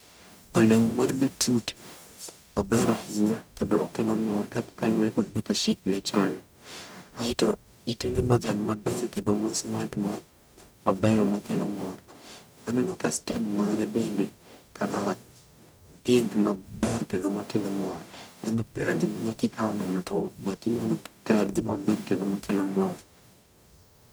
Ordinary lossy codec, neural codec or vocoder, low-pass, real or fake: none; codec, 44.1 kHz, 0.9 kbps, DAC; none; fake